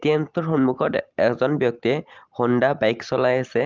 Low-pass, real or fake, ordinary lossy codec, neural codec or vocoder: 7.2 kHz; real; Opus, 32 kbps; none